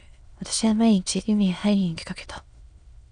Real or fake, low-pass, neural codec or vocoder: fake; 9.9 kHz; autoencoder, 22.05 kHz, a latent of 192 numbers a frame, VITS, trained on many speakers